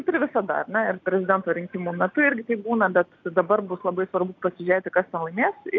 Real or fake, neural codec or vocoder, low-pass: real; none; 7.2 kHz